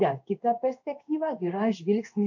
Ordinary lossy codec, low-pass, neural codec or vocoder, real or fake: AAC, 48 kbps; 7.2 kHz; codec, 16 kHz in and 24 kHz out, 1 kbps, XY-Tokenizer; fake